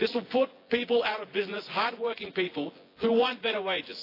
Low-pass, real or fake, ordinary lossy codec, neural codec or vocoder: 5.4 kHz; fake; AAC, 32 kbps; vocoder, 24 kHz, 100 mel bands, Vocos